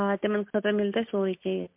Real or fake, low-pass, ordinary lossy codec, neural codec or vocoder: real; 3.6 kHz; MP3, 32 kbps; none